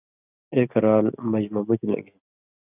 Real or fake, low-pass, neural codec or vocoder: real; 3.6 kHz; none